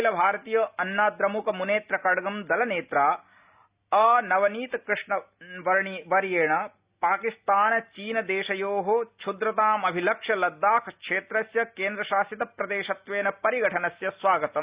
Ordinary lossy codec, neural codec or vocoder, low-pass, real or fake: Opus, 64 kbps; none; 3.6 kHz; real